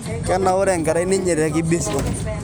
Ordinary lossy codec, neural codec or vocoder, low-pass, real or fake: none; none; none; real